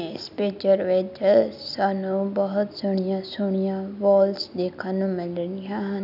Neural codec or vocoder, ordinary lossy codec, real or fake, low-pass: none; none; real; 5.4 kHz